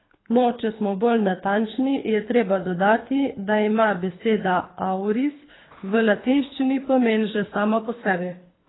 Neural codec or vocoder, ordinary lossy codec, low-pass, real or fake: codec, 24 kHz, 3 kbps, HILCodec; AAC, 16 kbps; 7.2 kHz; fake